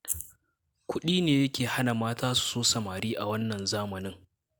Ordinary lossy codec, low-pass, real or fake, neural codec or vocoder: none; none; real; none